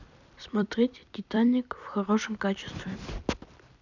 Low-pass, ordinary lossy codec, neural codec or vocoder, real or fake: 7.2 kHz; none; vocoder, 44.1 kHz, 128 mel bands every 256 samples, BigVGAN v2; fake